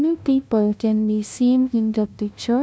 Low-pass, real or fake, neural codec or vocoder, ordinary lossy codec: none; fake; codec, 16 kHz, 0.5 kbps, FunCodec, trained on LibriTTS, 25 frames a second; none